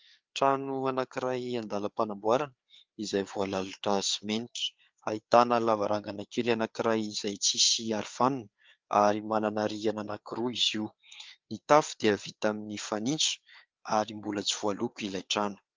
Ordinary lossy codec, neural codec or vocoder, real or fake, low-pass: Opus, 24 kbps; codec, 16 kHz, 4 kbps, FreqCodec, larger model; fake; 7.2 kHz